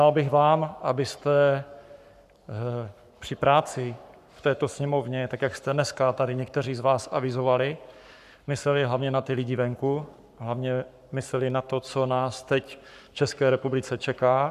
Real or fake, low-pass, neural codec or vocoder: fake; 14.4 kHz; codec, 44.1 kHz, 7.8 kbps, Pupu-Codec